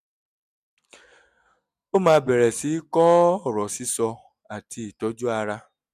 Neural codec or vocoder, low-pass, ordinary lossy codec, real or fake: none; 14.4 kHz; none; real